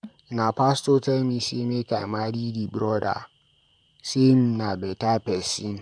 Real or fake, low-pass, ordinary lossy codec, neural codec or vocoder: fake; 9.9 kHz; none; vocoder, 44.1 kHz, 128 mel bands, Pupu-Vocoder